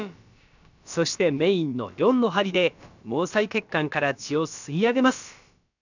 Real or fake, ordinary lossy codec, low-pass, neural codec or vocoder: fake; none; 7.2 kHz; codec, 16 kHz, about 1 kbps, DyCAST, with the encoder's durations